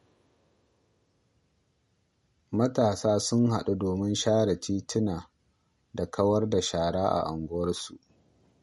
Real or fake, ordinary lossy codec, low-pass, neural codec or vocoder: real; MP3, 48 kbps; 19.8 kHz; none